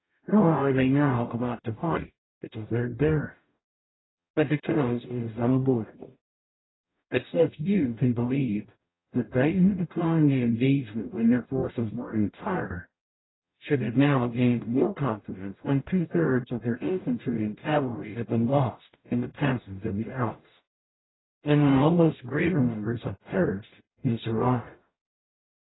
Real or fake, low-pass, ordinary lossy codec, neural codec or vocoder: fake; 7.2 kHz; AAC, 16 kbps; codec, 44.1 kHz, 0.9 kbps, DAC